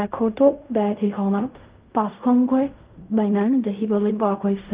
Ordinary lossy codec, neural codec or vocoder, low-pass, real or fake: Opus, 32 kbps; codec, 16 kHz in and 24 kHz out, 0.4 kbps, LongCat-Audio-Codec, fine tuned four codebook decoder; 3.6 kHz; fake